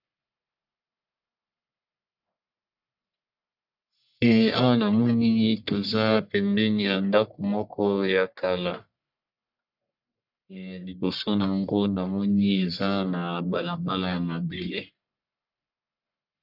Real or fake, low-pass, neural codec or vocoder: fake; 5.4 kHz; codec, 44.1 kHz, 1.7 kbps, Pupu-Codec